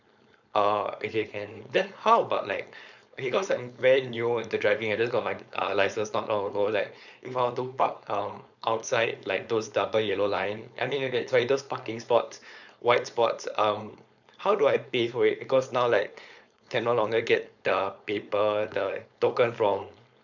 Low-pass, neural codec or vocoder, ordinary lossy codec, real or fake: 7.2 kHz; codec, 16 kHz, 4.8 kbps, FACodec; none; fake